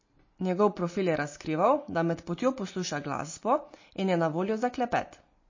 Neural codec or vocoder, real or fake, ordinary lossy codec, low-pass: none; real; MP3, 32 kbps; 7.2 kHz